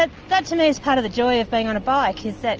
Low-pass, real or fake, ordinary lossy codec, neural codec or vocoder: 7.2 kHz; real; Opus, 24 kbps; none